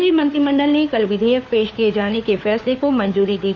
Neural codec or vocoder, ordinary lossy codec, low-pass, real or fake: codec, 16 kHz, 4 kbps, FunCodec, trained on LibriTTS, 50 frames a second; none; 7.2 kHz; fake